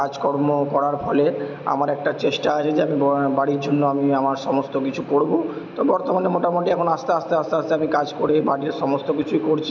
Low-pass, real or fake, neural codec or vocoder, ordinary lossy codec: 7.2 kHz; real; none; none